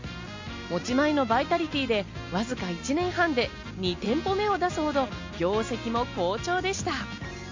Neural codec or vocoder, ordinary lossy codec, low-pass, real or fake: none; MP3, 48 kbps; 7.2 kHz; real